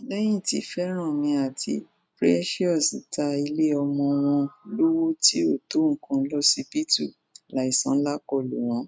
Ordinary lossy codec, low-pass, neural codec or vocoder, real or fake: none; none; none; real